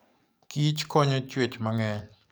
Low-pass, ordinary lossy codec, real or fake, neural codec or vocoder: none; none; fake; codec, 44.1 kHz, 7.8 kbps, DAC